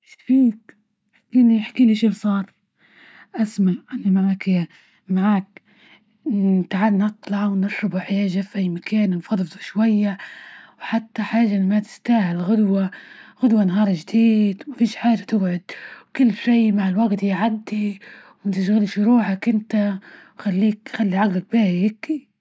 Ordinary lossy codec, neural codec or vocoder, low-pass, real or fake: none; none; none; real